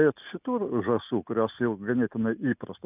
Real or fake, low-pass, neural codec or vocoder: real; 3.6 kHz; none